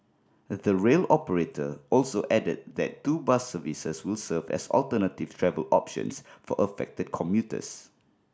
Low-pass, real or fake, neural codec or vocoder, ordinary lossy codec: none; real; none; none